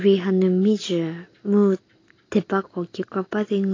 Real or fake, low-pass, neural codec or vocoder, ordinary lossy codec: real; 7.2 kHz; none; AAC, 32 kbps